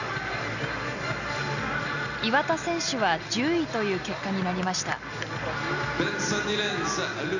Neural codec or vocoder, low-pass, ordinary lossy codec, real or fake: none; 7.2 kHz; none; real